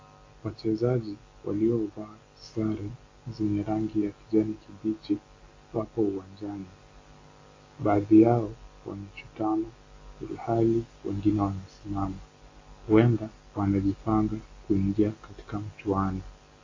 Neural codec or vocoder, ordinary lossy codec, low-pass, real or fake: none; AAC, 32 kbps; 7.2 kHz; real